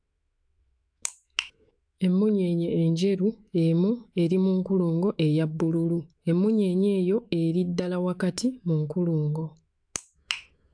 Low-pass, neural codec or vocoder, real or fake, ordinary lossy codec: 9.9 kHz; autoencoder, 48 kHz, 128 numbers a frame, DAC-VAE, trained on Japanese speech; fake; AAC, 64 kbps